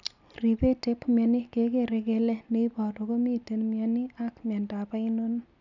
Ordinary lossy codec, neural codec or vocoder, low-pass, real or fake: none; none; 7.2 kHz; real